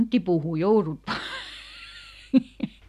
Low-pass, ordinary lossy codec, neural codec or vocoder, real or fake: 14.4 kHz; none; none; real